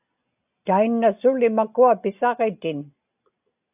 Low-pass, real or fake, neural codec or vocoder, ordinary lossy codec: 3.6 kHz; real; none; AAC, 32 kbps